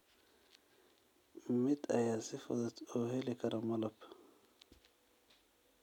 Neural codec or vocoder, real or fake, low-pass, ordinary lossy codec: none; real; 19.8 kHz; none